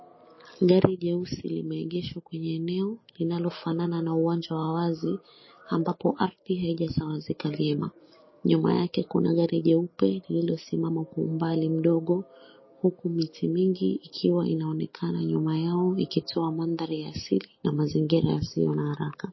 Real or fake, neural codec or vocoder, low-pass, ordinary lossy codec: real; none; 7.2 kHz; MP3, 24 kbps